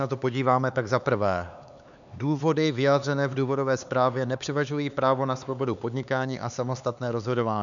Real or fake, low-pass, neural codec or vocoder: fake; 7.2 kHz; codec, 16 kHz, 4 kbps, X-Codec, HuBERT features, trained on LibriSpeech